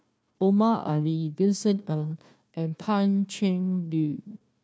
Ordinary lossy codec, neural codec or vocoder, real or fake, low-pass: none; codec, 16 kHz, 1 kbps, FunCodec, trained on Chinese and English, 50 frames a second; fake; none